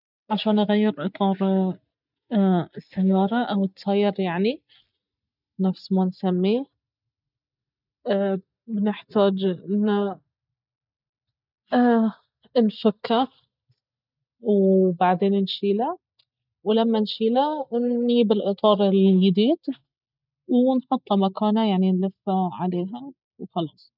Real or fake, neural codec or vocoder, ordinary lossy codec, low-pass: real; none; none; 5.4 kHz